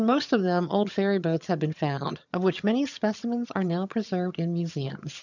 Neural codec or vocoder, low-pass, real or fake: vocoder, 22.05 kHz, 80 mel bands, HiFi-GAN; 7.2 kHz; fake